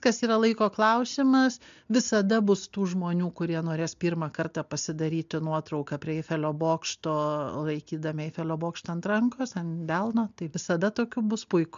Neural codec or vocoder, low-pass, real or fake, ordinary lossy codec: none; 7.2 kHz; real; MP3, 64 kbps